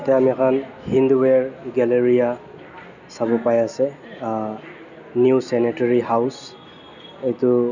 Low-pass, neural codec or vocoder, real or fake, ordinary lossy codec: 7.2 kHz; none; real; none